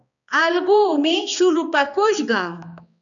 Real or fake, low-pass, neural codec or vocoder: fake; 7.2 kHz; codec, 16 kHz, 2 kbps, X-Codec, HuBERT features, trained on balanced general audio